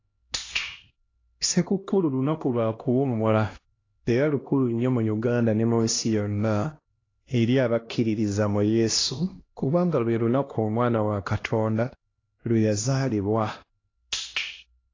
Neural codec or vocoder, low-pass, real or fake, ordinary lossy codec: codec, 16 kHz, 1 kbps, X-Codec, HuBERT features, trained on LibriSpeech; 7.2 kHz; fake; AAC, 32 kbps